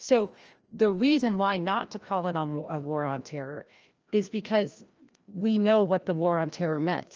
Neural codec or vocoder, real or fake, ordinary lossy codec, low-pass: codec, 16 kHz, 1 kbps, FreqCodec, larger model; fake; Opus, 24 kbps; 7.2 kHz